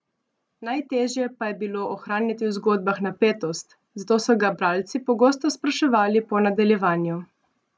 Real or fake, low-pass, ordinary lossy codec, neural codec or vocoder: real; none; none; none